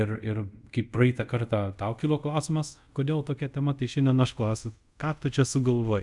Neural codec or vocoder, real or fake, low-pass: codec, 24 kHz, 0.5 kbps, DualCodec; fake; 10.8 kHz